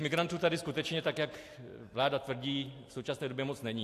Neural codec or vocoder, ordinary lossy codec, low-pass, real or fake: none; AAC, 64 kbps; 14.4 kHz; real